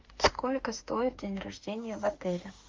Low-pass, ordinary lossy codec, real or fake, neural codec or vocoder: 7.2 kHz; Opus, 32 kbps; fake; autoencoder, 48 kHz, 32 numbers a frame, DAC-VAE, trained on Japanese speech